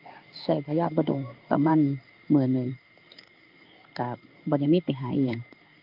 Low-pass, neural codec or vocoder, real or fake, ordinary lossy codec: 5.4 kHz; codec, 16 kHz in and 24 kHz out, 1 kbps, XY-Tokenizer; fake; Opus, 24 kbps